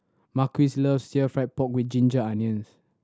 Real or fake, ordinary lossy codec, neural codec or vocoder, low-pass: real; none; none; none